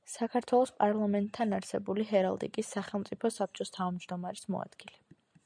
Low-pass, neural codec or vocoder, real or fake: 9.9 kHz; none; real